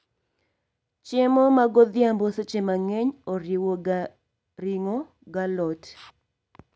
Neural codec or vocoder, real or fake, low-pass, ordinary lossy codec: none; real; none; none